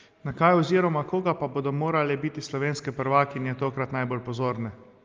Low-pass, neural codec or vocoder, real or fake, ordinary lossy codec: 7.2 kHz; none; real; Opus, 24 kbps